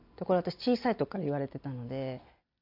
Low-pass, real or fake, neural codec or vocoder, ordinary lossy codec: 5.4 kHz; real; none; AAC, 48 kbps